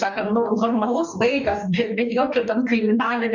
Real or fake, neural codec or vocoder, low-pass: fake; codec, 44.1 kHz, 2.6 kbps, DAC; 7.2 kHz